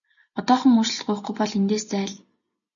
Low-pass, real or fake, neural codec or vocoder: 7.2 kHz; real; none